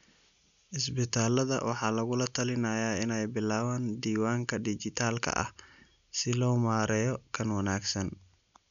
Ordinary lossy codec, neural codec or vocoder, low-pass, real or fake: none; none; 7.2 kHz; real